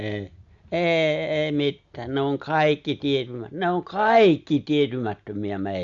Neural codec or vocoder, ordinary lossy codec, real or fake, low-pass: none; Opus, 64 kbps; real; 7.2 kHz